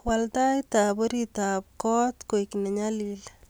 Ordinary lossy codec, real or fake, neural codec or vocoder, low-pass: none; real; none; none